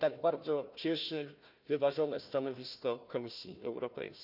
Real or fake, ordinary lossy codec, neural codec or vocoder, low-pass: fake; none; codec, 16 kHz, 1 kbps, FunCodec, trained on Chinese and English, 50 frames a second; 5.4 kHz